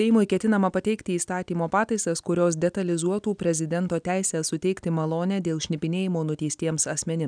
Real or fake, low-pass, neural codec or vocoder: real; 9.9 kHz; none